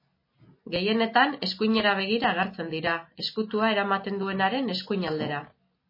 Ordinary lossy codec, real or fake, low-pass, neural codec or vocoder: MP3, 24 kbps; real; 5.4 kHz; none